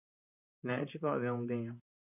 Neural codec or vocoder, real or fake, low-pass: vocoder, 44.1 kHz, 128 mel bands, Pupu-Vocoder; fake; 3.6 kHz